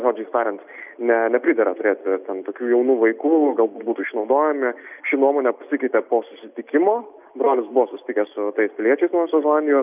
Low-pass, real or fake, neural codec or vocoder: 3.6 kHz; real; none